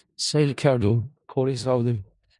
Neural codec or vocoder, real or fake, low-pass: codec, 16 kHz in and 24 kHz out, 0.4 kbps, LongCat-Audio-Codec, four codebook decoder; fake; 10.8 kHz